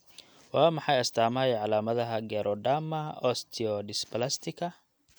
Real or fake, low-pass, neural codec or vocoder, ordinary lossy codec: real; none; none; none